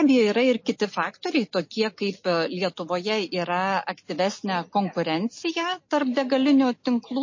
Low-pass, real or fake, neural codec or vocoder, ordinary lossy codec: 7.2 kHz; real; none; MP3, 32 kbps